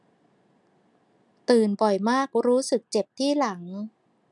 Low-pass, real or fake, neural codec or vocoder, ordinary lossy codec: 10.8 kHz; real; none; none